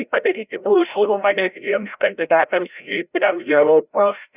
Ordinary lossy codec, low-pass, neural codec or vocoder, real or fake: Opus, 64 kbps; 3.6 kHz; codec, 16 kHz, 0.5 kbps, FreqCodec, larger model; fake